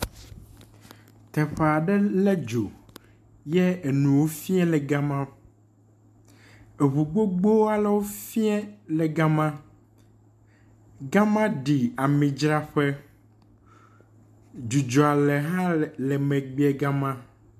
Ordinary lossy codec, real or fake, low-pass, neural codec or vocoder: MP3, 96 kbps; real; 14.4 kHz; none